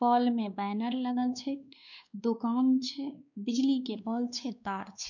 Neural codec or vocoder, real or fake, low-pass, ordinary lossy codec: codec, 16 kHz, 2 kbps, X-Codec, WavLM features, trained on Multilingual LibriSpeech; fake; 7.2 kHz; none